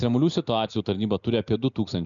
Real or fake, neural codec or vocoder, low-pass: real; none; 7.2 kHz